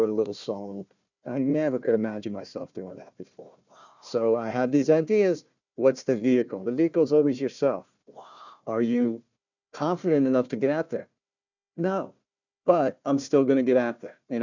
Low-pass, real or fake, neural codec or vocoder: 7.2 kHz; fake; codec, 16 kHz, 1 kbps, FunCodec, trained on Chinese and English, 50 frames a second